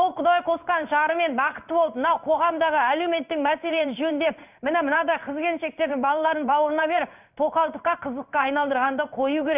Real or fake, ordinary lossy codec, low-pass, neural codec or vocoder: fake; none; 3.6 kHz; codec, 16 kHz in and 24 kHz out, 1 kbps, XY-Tokenizer